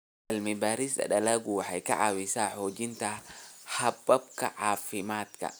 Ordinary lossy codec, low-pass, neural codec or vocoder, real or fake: none; none; none; real